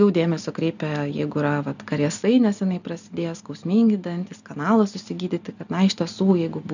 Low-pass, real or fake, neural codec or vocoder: 7.2 kHz; real; none